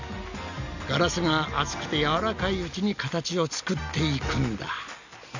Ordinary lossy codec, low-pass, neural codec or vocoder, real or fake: none; 7.2 kHz; none; real